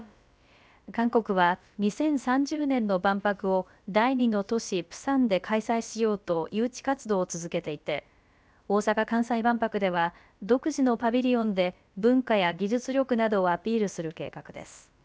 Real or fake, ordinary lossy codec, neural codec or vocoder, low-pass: fake; none; codec, 16 kHz, about 1 kbps, DyCAST, with the encoder's durations; none